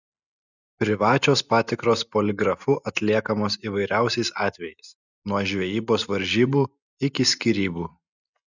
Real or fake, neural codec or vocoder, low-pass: real; none; 7.2 kHz